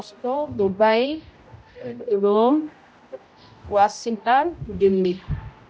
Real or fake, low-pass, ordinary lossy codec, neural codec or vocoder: fake; none; none; codec, 16 kHz, 0.5 kbps, X-Codec, HuBERT features, trained on general audio